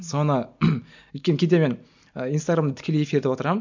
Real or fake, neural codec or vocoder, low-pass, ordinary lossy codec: real; none; 7.2 kHz; MP3, 64 kbps